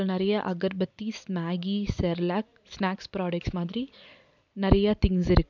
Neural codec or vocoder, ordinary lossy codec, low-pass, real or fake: none; none; 7.2 kHz; real